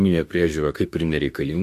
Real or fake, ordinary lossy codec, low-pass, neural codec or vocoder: fake; MP3, 64 kbps; 14.4 kHz; autoencoder, 48 kHz, 32 numbers a frame, DAC-VAE, trained on Japanese speech